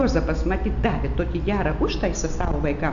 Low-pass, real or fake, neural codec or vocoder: 7.2 kHz; real; none